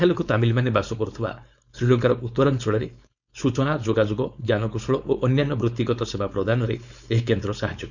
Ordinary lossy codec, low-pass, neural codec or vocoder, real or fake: none; 7.2 kHz; codec, 16 kHz, 4.8 kbps, FACodec; fake